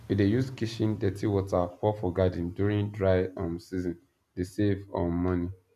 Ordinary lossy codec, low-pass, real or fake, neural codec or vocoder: none; 14.4 kHz; real; none